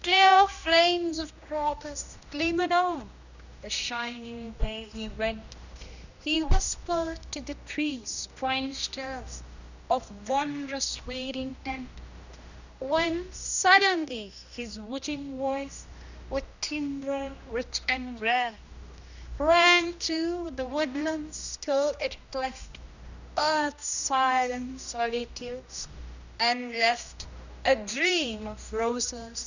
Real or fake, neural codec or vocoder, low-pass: fake; codec, 16 kHz, 1 kbps, X-Codec, HuBERT features, trained on general audio; 7.2 kHz